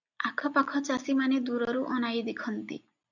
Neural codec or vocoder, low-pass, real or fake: none; 7.2 kHz; real